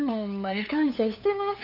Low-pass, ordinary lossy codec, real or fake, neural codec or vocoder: 5.4 kHz; none; fake; codec, 16 kHz, 8 kbps, FunCodec, trained on LibriTTS, 25 frames a second